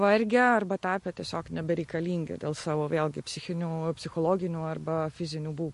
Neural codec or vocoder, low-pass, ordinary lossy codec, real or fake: none; 14.4 kHz; MP3, 48 kbps; real